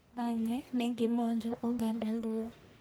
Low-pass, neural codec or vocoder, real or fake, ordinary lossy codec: none; codec, 44.1 kHz, 1.7 kbps, Pupu-Codec; fake; none